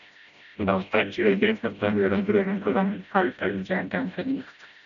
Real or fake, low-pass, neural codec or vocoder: fake; 7.2 kHz; codec, 16 kHz, 0.5 kbps, FreqCodec, smaller model